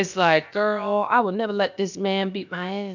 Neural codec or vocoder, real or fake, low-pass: codec, 16 kHz, about 1 kbps, DyCAST, with the encoder's durations; fake; 7.2 kHz